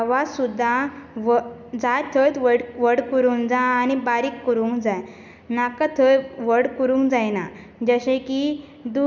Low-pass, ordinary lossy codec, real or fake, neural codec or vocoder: 7.2 kHz; none; real; none